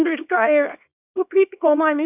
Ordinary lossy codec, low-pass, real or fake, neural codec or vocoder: none; 3.6 kHz; fake; codec, 24 kHz, 0.9 kbps, WavTokenizer, small release